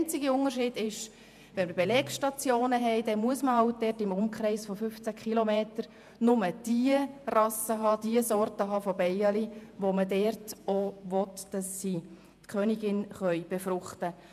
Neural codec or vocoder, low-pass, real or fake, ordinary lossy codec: vocoder, 48 kHz, 128 mel bands, Vocos; 14.4 kHz; fake; none